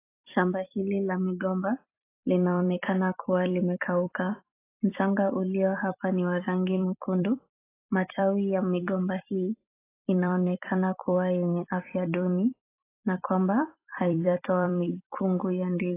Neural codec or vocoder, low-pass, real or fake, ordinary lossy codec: vocoder, 44.1 kHz, 128 mel bands every 256 samples, BigVGAN v2; 3.6 kHz; fake; AAC, 24 kbps